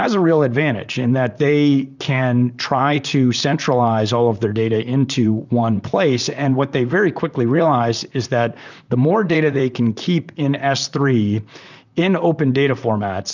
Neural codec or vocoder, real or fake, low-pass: vocoder, 44.1 kHz, 128 mel bands, Pupu-Vocoder; fake; 7.2 kHz